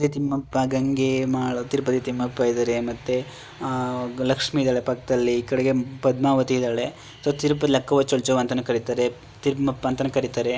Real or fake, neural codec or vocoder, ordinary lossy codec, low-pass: real; none; none; none